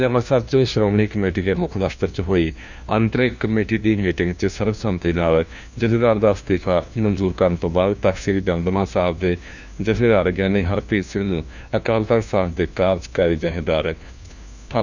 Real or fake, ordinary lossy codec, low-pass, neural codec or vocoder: fake; none; 7.2 kHz; codec, 16 kHz, 1 kbps, FunCodec, trained on LibriTTS, 50 frames a second